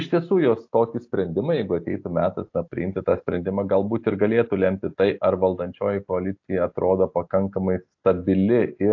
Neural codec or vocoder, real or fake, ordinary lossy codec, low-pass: none; real; AAC, 48 kbps; 7.2 kHz